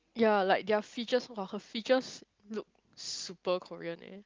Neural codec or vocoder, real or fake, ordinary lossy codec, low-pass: none; real; Opus, 32 kbps; 7.2 kHz